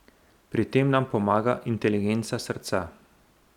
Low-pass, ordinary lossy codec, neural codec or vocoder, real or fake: 19.8 kHz; none; none; real